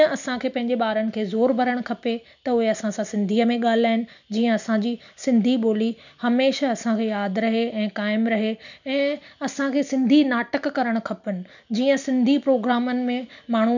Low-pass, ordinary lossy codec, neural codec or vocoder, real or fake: 7.2 kHz; none; none; real